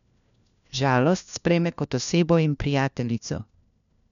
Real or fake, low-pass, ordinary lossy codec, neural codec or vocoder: fake; 7.2 kHz; none; codec, 16 kHz, 1 kbps, FunCodec, trained on LibriTTS, 50 frames a second